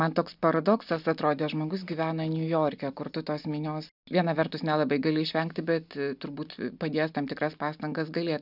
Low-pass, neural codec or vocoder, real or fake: 5.4 kHz; none; real